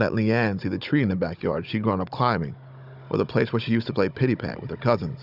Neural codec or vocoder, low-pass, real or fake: codec, 16 kHz, 16 kbps, FunCodec, trained on Chinese and English, 50 frames a second; 5.4 kHz; fake